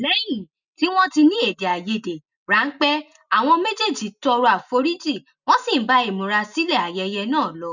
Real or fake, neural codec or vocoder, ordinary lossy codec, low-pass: real; none; none; 7.2 kHz